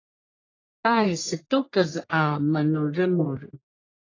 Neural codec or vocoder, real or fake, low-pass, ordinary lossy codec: codec, 44.1 kHz, 1.7 kbps, Pupu-Codec; fake; 7.2 kHz; AAC, 32 kbps